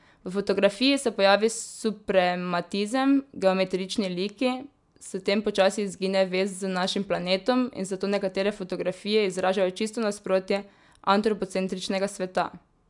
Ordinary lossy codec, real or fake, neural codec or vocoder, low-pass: MP3, 96 kbps; real; none; 10.8 kHz